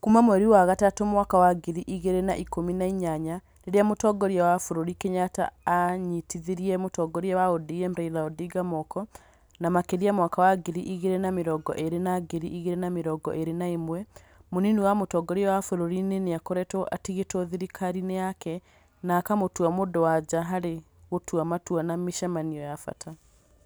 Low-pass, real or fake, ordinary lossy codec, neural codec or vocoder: none; real; none; none